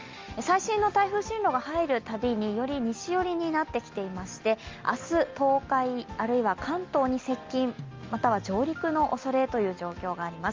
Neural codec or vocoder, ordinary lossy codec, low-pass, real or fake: none; Opus, 32 kbps; 7.2 kHz; real